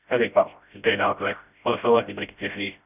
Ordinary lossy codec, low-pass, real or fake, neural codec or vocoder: none; 3.6 kHz; fake; codec, 16 kHz, 0.5 kbps, FreqCodec, smaller model